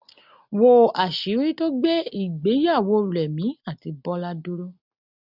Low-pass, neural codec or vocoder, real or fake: 5.4 kHz; none; real